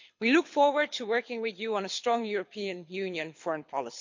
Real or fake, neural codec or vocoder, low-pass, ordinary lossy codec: fake; codec, 24 kHz, 6 kbps, HILCodec; 7.2 kHz; MP3, 48 kbps